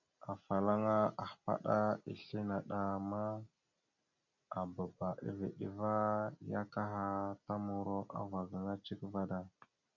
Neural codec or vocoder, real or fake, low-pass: none; real; 7.2 kHz